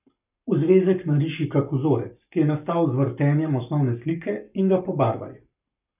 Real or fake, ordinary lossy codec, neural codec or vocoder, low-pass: fake; none; codec, 44.1 kHz, 7.8 kbps, Pupu-Codec; 3.6 kHz